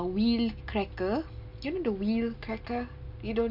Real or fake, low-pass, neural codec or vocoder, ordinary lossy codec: real; 5.4 kHz; none; none